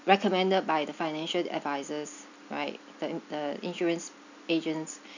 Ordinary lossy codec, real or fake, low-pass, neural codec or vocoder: none; real; 7.2 kHz; none